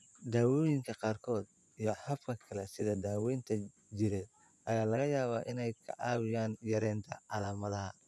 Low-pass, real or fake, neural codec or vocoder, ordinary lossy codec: none; fake; vocoder, 24 kHz, 100 mel bands, Vocos; none